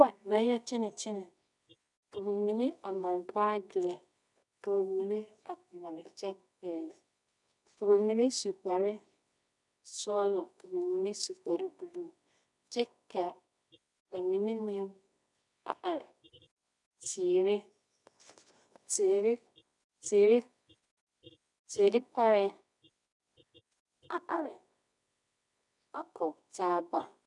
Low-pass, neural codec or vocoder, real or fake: 10.8 kHz; codec, 24 kHz, 0.9 kbps, WavTokenizer, medium music audio release; fake